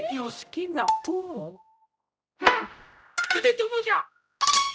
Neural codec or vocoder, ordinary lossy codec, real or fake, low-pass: codec, 16 kHz, 0.5 kbps, X-Codec, HuBERT features, trained on general audio; none; fake; none